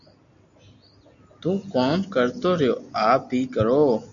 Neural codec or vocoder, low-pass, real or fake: none; 7.2 kHz; real